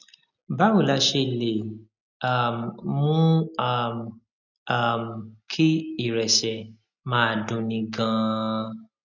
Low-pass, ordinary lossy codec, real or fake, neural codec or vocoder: 7.2 kHz; none; real; none